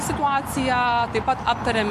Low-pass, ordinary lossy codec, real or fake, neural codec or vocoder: 14.4 kHz; MP3, 64 kbps; real; none